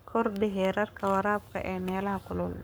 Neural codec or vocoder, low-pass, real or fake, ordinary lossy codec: codec, 44.1 kHz, 7.8 kbps, Pupu-Codec; none; fake; none